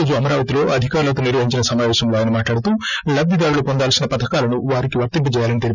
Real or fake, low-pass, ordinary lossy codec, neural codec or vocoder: real; 7.2 kHz; none; none